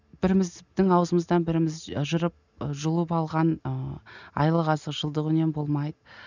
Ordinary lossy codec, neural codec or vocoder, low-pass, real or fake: none; none; 7.2 kHz; real